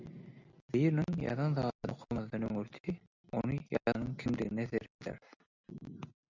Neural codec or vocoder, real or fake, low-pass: none; real; 7.2 kHz